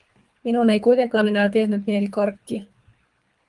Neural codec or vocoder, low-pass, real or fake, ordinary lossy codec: codec, 24 kHz, 3 kbps, HILCodec; 10.8 kHz; fake; Opus, 32 kbps